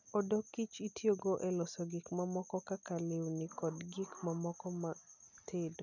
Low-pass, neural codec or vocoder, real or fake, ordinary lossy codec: 7.2 kHz; none; real; none